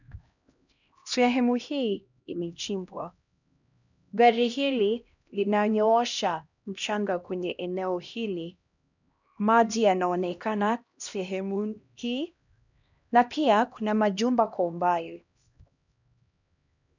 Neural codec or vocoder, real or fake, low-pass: codec, 16 kHz, 1 kbps, X-Codec, HuBERT features, trained on LibriSpeech; fake; 7.2 kHz